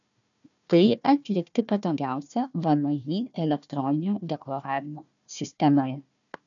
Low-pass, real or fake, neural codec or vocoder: 7.2 kHz; fake; codec, 16 kHz, 1 kbps, FunCodec, trained on Chinese and English, 50 frames a second